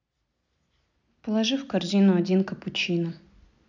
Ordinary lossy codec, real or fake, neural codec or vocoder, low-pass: none; real; none; 7.2 kHz